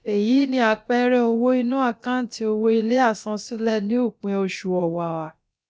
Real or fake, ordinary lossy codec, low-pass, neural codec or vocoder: fake; none; none; codec, 16 kHz, about 1 kbps, DyCAST, with the encoder's durations